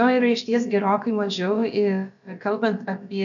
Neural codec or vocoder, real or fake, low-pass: codec, 16 kHz, about 1 kbps, DyCAST, with the encoder's durations; fake; 7.2 kHz